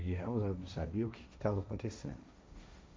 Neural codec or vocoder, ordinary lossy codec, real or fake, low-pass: codec, 16 kHz, 1.1 kbps, Voila-Tokenizer; none; fake; none